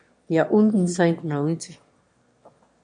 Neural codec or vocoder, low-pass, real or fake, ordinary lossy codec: autoencoder, 22.05 kHz, a latent of 192 numbers a frame, VITS, trained on one speaker; 9.9 kHz; fake; MP3, 48 kbps